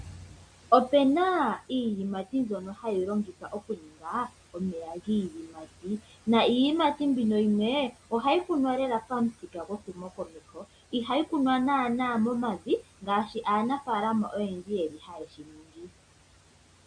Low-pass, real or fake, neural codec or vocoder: 9.9 kHz; real; none